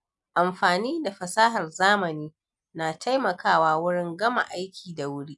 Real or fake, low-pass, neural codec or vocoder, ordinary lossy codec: real; 10.8 kHz; none; none